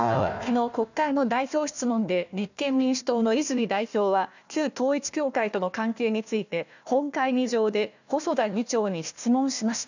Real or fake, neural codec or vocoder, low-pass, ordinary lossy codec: fake; codec, 16 kHz, 1 kbps, FunCodec, trained on Chinese and English, 50 frames a second; 7.2 kHz; none